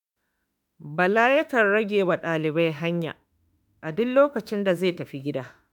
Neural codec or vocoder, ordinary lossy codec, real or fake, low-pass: autoencoder, 48 kHz, 32 numbers a frame, DAC-VAE, trained on Japanese speech; none; fake; 19.8 kHz